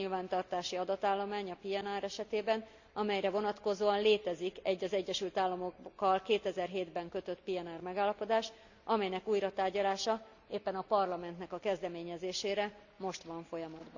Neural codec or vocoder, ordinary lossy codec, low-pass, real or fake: none; none; 7.2 kHz; real